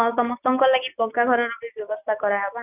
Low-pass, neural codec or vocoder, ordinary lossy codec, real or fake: 3.6 kHz; none; none; real